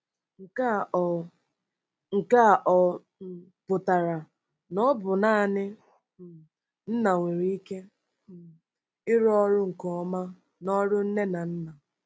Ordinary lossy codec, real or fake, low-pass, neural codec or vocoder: none; real; none; none